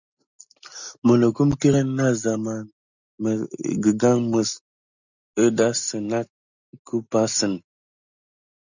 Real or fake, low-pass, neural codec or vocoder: real; 7.2 kHz; none